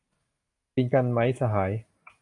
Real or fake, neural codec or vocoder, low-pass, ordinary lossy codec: real; none; 10.8 kHz; MP3, 96 kbps